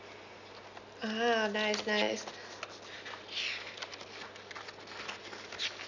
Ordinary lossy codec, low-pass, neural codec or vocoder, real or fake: none; 7.2 kHz; none; real